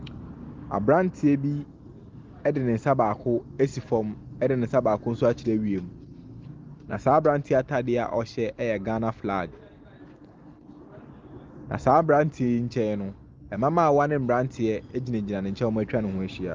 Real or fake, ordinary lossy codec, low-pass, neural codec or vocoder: real; Opus, 32 kbps; 7.2 kHz; none